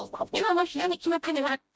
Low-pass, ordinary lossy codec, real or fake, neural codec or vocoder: none; none; fake; codec, 16 kHz, 0.5 kbps, FreqCodec, smaller model